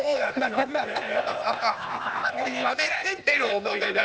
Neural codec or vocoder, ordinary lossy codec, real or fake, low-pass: codec, 16 kHz, 0.8 kbps, ZipCodec; none; fake; none